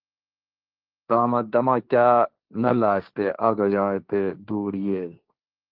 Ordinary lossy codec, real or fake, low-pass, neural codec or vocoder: Opus, 24 kbps; fake; 5.4 kHz; codec, 16 kHz, 1.1 kbps, Voila-Tokenizer